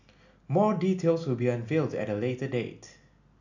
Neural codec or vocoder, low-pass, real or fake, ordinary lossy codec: none; 7.2 kHz; real; none